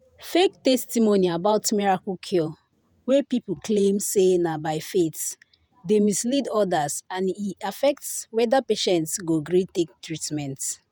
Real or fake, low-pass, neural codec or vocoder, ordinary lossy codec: fake; none; vocoder, 48 kHz, 128 mel bands, Vocos; none